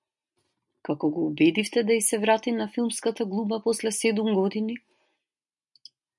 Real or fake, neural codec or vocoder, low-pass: real; none; 10.8 kHz